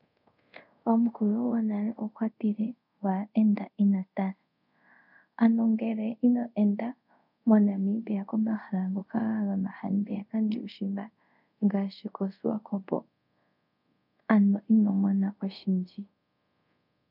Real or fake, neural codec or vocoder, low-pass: fake; codec, 24 kHz, 0.5 kbps, DualCodec; 5.4 kHz